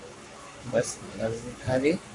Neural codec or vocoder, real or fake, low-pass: codec, 44.1 kHz, 7.8 kbps, Pupu-Codec; fake; 10.8 kHz